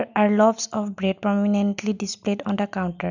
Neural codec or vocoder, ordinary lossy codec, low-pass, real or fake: none; none; 7.2 kHz; real